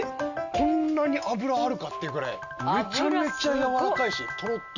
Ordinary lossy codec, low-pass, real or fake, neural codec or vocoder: none; 7.2 kHz; real; none